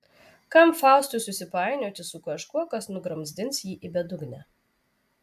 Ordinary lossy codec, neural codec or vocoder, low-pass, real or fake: AAC, 96 kbps; none; 14.4 kHz; real